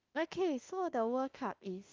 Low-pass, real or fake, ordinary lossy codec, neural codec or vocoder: 7.2 kHz; fake; Opus, 32 kbps; autoencoder, 48 kHz, 32 numbers a frame, DAC-VAE, trained on Japanese speech